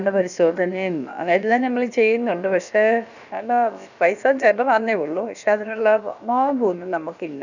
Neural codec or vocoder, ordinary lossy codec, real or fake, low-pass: codec, 16 kHz, about 1 kbps, DyCAST, with the encoder's durations; none; fake; 7.2 kHz